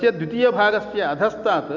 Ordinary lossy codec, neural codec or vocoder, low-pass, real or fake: none; none; 7.2 kHz; real